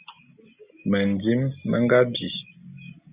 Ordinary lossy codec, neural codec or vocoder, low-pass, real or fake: Opus, 64 kbps; none; 3.6 kHz; real